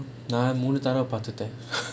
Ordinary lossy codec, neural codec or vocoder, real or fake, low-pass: none; none; real; none